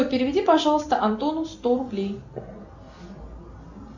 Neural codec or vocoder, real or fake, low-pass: none; real; 7.2 kHz